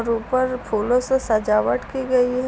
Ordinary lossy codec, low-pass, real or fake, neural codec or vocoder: none; none; real; none